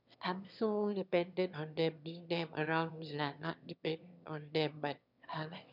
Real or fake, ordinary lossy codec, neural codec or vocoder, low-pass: fake; none; autoencoder, 22.05 kHz, a latent of 192 numbers a frame, VITS, trained on one speaker; 5.4 kHz